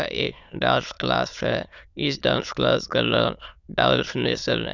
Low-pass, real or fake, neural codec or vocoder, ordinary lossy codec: 7.2 kHz; fake; autoencoder, 22.05 kHz, a latent of 192 numbers a frame, VITS, trained on many speakers; none